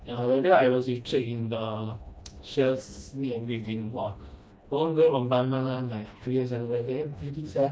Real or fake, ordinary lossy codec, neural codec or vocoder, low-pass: fake; none; codec, 16 kHz, 1 kbps, FreqCodec, smaller model; none